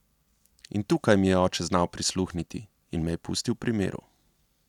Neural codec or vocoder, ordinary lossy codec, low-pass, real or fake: none; none; 19.8 kHz; real